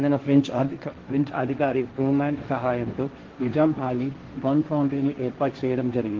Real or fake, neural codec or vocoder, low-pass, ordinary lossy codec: fake; codec, 16 kHz, 1.1 kbps, Voila-Tokenizer; 7.2 kHz; Opus, 16 kbps